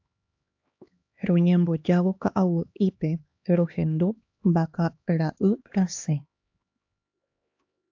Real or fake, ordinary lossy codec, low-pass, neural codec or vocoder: fake; AAC, 48 kbps; 7.2 kHz; codec, 16 kHz, 2 kbps, X-Codec, HuBERT features, trained on LibriSpeech